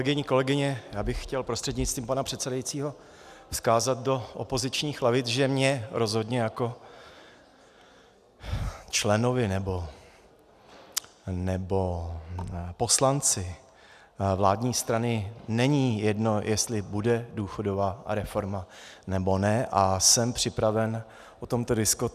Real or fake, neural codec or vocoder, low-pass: real; none; 14.4 kHz